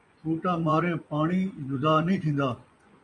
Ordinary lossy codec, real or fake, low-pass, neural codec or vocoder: MP3, 96 kbps; fake; 10.8 kHz; vocoder, 24 kHz, 100 mel bands, Vocos